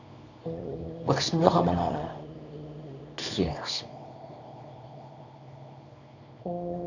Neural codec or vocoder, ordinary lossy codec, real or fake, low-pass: codec, 24 kHz, 0.9 kbps, WavTokenizer, small release; none; fake; 7.2 kHz